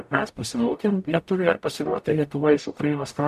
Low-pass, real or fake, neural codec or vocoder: 14.4 kHz; fake; codec, 44.1 kHz, 0.9 kbps, DAC